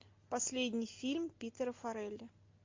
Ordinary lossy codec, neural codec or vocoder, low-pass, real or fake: MP3, 48 kbps; none; 7.2 kHz; real